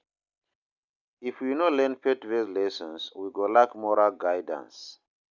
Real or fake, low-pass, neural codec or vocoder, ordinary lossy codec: real; 7.2 kHz; none; none